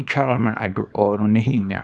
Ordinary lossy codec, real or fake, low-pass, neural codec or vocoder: none; fake; none; codec, 24 kHz, 0.9 kbps, WavTokenizer, small release